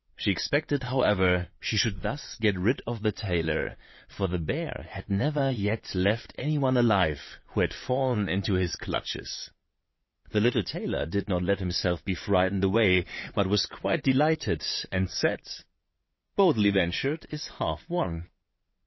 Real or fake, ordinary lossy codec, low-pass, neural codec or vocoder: fake; MP3, 24 kbps; 7.2 kHz; vocoder, 22.05 kHz, 80 mel bands, WaveNeXt